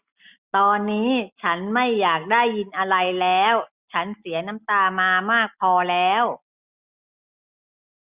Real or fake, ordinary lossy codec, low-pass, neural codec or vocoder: real; Opus, 64 kbps; 3.6 kHz; none